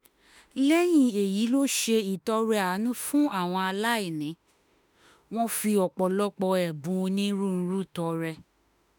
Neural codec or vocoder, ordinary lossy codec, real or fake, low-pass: autoencoder, 48 kHz, 32 numbers a frame, DAC-VAE, trained on Japanese speech; none; fake; none